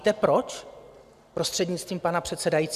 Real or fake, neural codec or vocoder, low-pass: real; none; 14.4 kHz